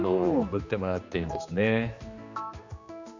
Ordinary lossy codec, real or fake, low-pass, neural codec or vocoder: none; fake; 7.2 kHz; codec, 16 kHz, 2 kbps, X-Codec, HuBERT features, trained on general audio